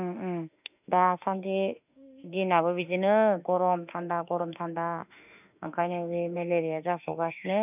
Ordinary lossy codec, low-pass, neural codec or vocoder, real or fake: none; 3.6 kHz; autoencoder, 48 kHz, 32 numbers a frame, DAC-VAE, trained on Japanese speech; fake